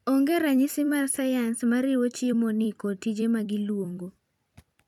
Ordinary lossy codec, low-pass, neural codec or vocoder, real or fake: none; 19.8 kHz; vocoder, 44.1 kHz, 128 mel bands every 256 samples, BigVGAN v2; fake